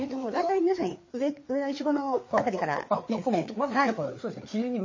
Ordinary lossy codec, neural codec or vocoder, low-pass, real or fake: MP3, 32 kbps; codec, 16 kHz, 4 kbps, FreqCodec, larger model; 7.2 kHz; fake